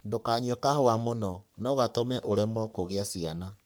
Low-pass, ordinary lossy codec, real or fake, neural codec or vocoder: none; none; fake; codec, 44.1 kHz, 3.4 kbps, Pupu-Codec